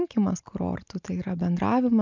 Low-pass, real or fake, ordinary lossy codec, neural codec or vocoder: 7.2 kHz; real; MP3, 64 kbps; none